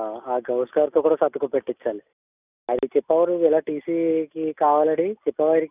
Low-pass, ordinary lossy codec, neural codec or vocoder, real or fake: 3.6 kHz; none; none; real